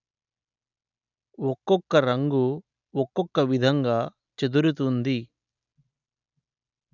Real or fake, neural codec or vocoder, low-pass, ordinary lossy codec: real; none; 7.2 kHz; none